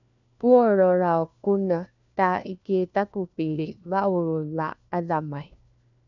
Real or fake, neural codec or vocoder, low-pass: fake; codec, 16 kHz, 1 kbps, FunCodec, trained on LibriTTS, 50 frames a second; 7.2 kHz